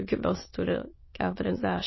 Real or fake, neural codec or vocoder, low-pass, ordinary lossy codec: fake; autoencoder, 22.05 kHz, a latent of 192 numbers a frame, VITS, trained on many speakers; 7.2 kHz; MP3, 24 kbps